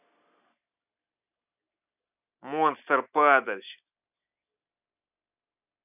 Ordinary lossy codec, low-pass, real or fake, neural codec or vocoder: none; 3.6 kHz; real; none